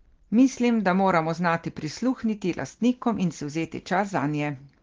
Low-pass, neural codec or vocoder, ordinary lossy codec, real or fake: 7.2 kHz; none; Opus, 16 kbps; real